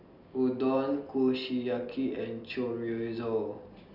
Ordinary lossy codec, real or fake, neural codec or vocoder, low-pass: none; real; none; 5.4 kHz